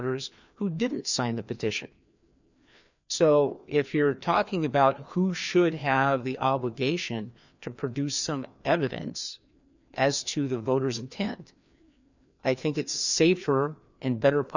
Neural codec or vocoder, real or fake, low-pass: codec, 16 kHz, 2 kbps, FreqCodec, larger model; fake; 7.2 kHz